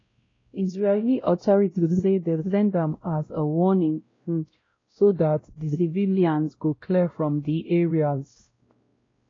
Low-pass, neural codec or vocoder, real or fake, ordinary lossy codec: 7.2 kHz; codec, 16 kHz, 0.5 kbps, X-Codec, WavLM features, trained on Multilingual LibriSpeech; fake; AAC, 32 kbps